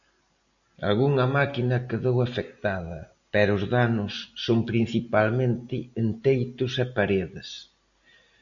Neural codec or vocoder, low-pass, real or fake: none; 7.2 kHz; real